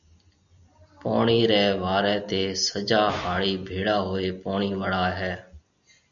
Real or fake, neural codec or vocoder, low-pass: real; none; 7.2 kHz